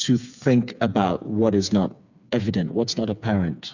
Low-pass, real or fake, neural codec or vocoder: 7.2 kHz; fake; codec, 16 kHz, 4 kbps, FreqCodec, smaller model